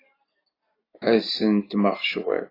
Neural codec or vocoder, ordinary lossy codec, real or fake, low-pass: none; AAC, 24 kbps; real; 5.4 kHz